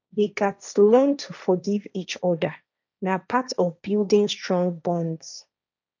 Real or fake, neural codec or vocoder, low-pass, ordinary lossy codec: fake; codec, 16 kHz, 1.1 kbps, Voila-Tokenizer; 7.2 kHz; none